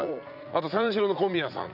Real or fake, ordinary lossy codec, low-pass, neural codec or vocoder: fake; none; 5.4 kHz; vocoder, 44.1 kHz, 80 mel bands, Vocos